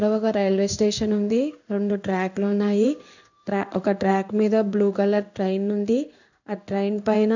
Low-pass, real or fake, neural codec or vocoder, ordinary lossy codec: 7.2 kHz; fake; codec, 16 kHz in and 24 kHz out, 1 kbps, XY-Tokenizer; none